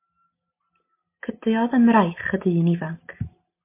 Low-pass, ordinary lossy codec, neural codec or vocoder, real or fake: 3.6 kHz; MP3, 24 kbps; none; real